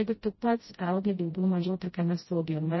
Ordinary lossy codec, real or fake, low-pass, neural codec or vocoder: MP3, 24 kbps; fake; 7.2 kHz; codec, 16 kHz, 0.5 kbps, FreqCodec, smaller model